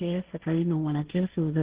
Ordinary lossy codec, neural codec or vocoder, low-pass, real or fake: Opus, 16 kbps; codec, 16 kHz, 1.1 kbps, Voila-Tokenizer; 3.6 kHz; fake